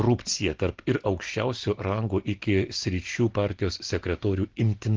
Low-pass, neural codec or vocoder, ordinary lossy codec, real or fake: 7.2 kHz; none; Opus, 16 kbps; real